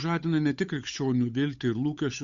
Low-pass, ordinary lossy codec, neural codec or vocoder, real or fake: 7.2 kHz; Opus, 64 kbps; codec, 16 kHz, 8 kbps, FunCodec, trained on Chinese and English, 25 frames a second; fake